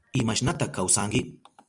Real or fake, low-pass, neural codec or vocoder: fake; 10.8 kHz; vocoder, 44.1 kHz, 128 mel bands every 256 samples, BigVGAN v2